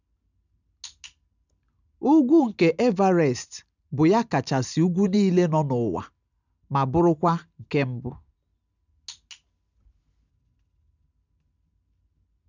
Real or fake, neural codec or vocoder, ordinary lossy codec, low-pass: real; none; none; 7.2 kHz